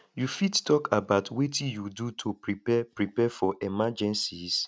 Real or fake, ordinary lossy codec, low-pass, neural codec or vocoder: real; none; none; none